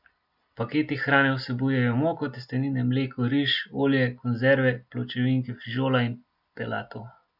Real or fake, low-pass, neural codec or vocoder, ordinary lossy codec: real; 5.4 kHz; none; none